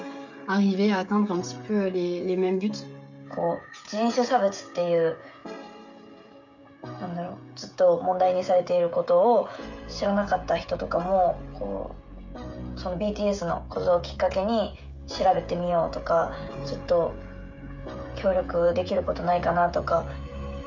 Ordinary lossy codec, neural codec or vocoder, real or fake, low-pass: none; codec, 16 kHz, 16 kbps, FreqCodec, smaller model; fake; 7.2 kHz